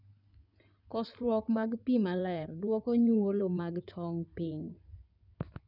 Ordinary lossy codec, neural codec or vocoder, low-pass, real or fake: none; codec, 16 kHz in and 24 kHz out, 2.2 kbps, FireRedTTS-2 codec; 5.4 kHz; fake